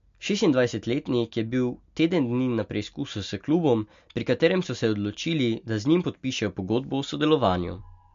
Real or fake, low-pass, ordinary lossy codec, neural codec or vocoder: real; 7.2 kHz; MP3, 48 kbps; none